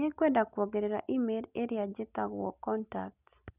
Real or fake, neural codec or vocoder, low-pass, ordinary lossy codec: real; none; 3.6 kHz; none